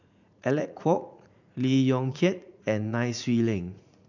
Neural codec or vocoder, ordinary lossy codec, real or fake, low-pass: vocoder, 44.1 kHz, 80 mel bands, Vocos; none; fake; 7.2 kHz